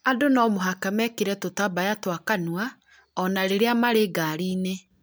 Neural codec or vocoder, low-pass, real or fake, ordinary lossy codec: none; none; real; none